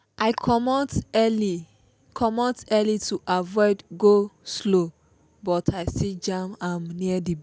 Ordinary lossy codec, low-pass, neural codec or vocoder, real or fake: none; none; none; real